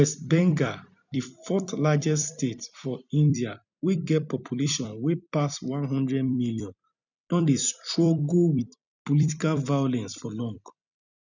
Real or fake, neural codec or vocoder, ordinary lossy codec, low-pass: fake; vocoder, 44.1 kHz, 128 mel bands every 256 samples, BigVGAN v2; none; 7.2 kHz